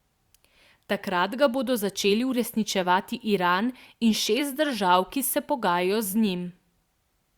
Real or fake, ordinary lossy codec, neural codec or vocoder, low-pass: fake; Opus, 64 kbps; vocoder, 44.1 kHz, 128 mel bands every 512 samples, BigVGAN v2; 19.8 kHz